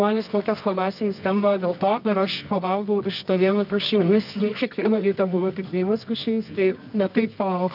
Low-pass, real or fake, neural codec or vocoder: 5.4 kHz; fake; codec, 24 kHz, 0.9 kbps, WavTokenizer, medium music audio release